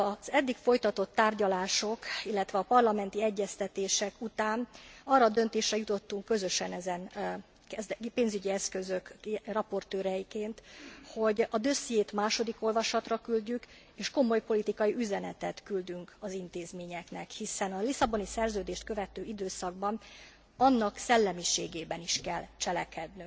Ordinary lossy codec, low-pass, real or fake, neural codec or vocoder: none; none; real; none